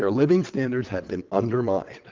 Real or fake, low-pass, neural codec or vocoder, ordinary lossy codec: fake; 7.2 kHz; vocoder, 22.05 kHz, 80 mel bands, WaveNeXt; Opus, 24 kbps